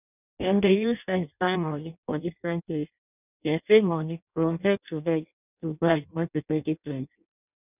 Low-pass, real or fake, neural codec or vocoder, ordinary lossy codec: 3.6 kHz; fake; codec, 16 kHz in and 24 kHz out, 0.6 kbps, FireRedTTS-2 codec; none